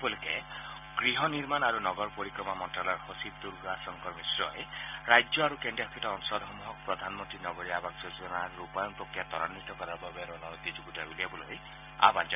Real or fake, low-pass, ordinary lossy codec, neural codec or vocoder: real; 3.6 kHz; none; none